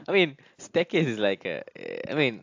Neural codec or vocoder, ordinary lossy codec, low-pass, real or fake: none; AAC, 48 kbps; 7.2 kHz; real